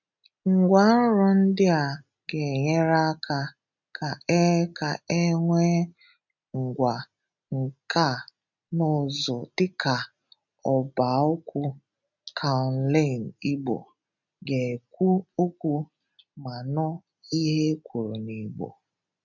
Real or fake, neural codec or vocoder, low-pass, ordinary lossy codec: real; none; 7.2 kHz; none